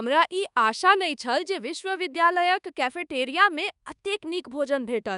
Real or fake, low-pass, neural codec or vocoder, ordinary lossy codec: fake; 10.8 kHz; codec, 24 kHz, 1.2 kbps, DualCodec; none